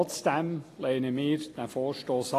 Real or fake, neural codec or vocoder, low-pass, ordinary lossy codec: real; none; 14.4 kHz; AAC, 48 kbps